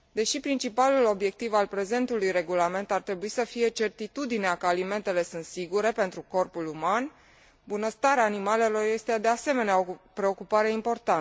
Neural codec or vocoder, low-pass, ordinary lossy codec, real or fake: none; none; none; real